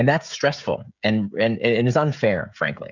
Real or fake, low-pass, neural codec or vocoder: fake; 7.2 kHz; codec, 16 kHz, 16 kbps, FreqCodec, smaller model